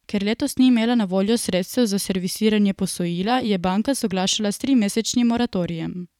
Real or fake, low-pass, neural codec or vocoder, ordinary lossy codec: fake; 19.8 kHz; vocoder, 44.1 kHz, 128 mel bands every 512 samples, BigVGAN v2; none